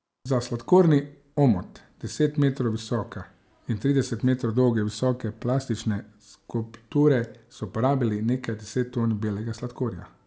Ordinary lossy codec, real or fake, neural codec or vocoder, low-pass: none; real; none; none